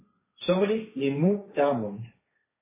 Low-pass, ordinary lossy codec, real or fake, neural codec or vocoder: 3.6 kHz; MP3, 16 kbps; fake; codec, 16 kHz, 1.1 kbps, Voila-Tokenizer